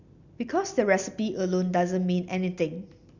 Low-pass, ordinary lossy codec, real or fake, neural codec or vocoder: 7.2 kHz; Opus, 64 kbps; real; none